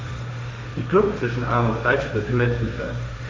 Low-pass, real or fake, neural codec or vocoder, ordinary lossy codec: none; fake; codec, 16 kHz, 1.1 kbps, Voila-Tokenizer; none